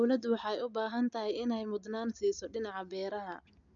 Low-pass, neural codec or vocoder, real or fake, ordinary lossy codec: 7.2 kHz; none; real; none